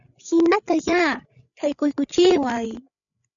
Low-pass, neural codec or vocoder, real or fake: 7.2 kHz; codec, 16 kHz, 8 kbps, FreqCodec, larger model; fake